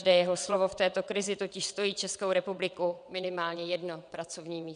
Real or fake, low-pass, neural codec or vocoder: fake; 9.9 kHz; vocoder, 22.05 kHz, 80 mel bands, WaveNeXt